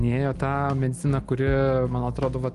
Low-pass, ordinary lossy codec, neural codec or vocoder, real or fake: 10.8 kHz; Opus, 24 kbps; none; real